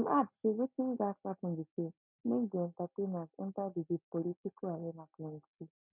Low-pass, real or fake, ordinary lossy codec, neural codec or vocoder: 3.6 kHz; real; none; none